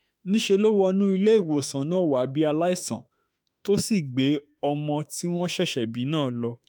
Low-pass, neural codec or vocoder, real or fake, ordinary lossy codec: none; autoencoder, 48 kHz, 32 numbers a frame, DAC-VAE, trained on Japanese speech; fake; none